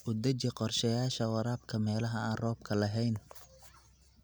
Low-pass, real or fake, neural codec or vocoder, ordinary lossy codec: none; real; none; none